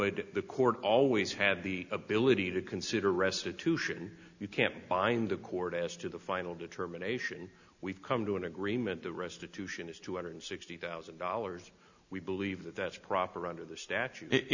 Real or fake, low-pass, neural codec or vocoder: real; 7.2 kHz; none